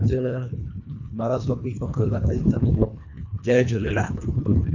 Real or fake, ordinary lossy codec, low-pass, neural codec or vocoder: fake; none; 7.2 kHz; codec, 24 kHz, 1.5 kbps, HILCodec